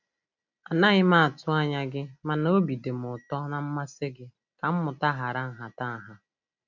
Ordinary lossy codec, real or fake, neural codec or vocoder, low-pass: none; real; none; 7.2 kHz